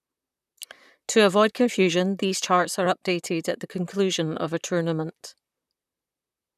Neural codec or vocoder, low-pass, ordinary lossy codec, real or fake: vocoder, 44.1 kHz, 128 mel bands, Pupu-Vocoder; 14.4 kHz; none; fake